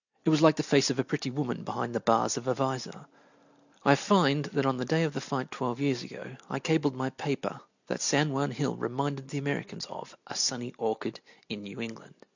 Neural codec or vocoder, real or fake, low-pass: none; real; 7.2 kHz